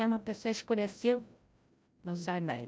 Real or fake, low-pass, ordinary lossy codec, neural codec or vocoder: fake; none; none; codec, 16 kHz, 0.5 kbps, FreqCodec, larger model